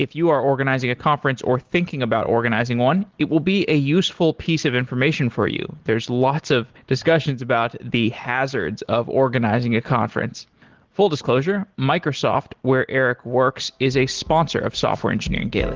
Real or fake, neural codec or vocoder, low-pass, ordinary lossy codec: real; none; 7.2 kHz; Opus, 16 kbps